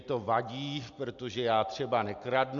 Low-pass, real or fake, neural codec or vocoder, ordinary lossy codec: 7.2 kHz; real; none; MP3, 96 kbps